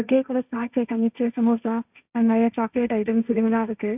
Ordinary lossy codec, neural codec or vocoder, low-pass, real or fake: none; codec, 16 kHz, 1.1 kbps, Voila-Tokenizer; 3.6 kHz; fake